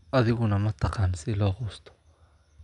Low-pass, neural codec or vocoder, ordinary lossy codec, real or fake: 10.8 kHz; none; none; real